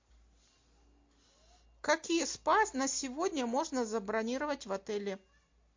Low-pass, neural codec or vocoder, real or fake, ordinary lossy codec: 7.2 kHz; none; real; MP3, 48 kbps